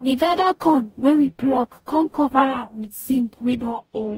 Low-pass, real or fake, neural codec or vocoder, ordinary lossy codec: 19.8 kHz; fake; codec, 44.1 kHz, 0.9 kbps, DAC; AAC, 48 kbps